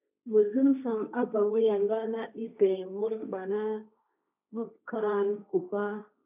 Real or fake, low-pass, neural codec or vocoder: fake; 3.6 kHz; codec, 16 kHz, 1.1 kbps, Voila-Tokenizer